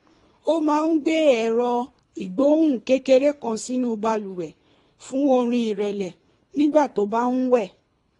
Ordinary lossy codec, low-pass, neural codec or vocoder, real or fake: AAC, 32 kbps; 10.8 kHz; codec, 24 kHz, 3 kbps, HILCodec; fake